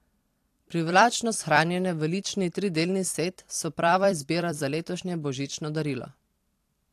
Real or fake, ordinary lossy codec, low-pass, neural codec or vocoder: fake; AAC, 64 kbps; 14.4 kHz; vocoder, 44.1 kHz, 128 mel bands every 256 samples, BigVGAN v2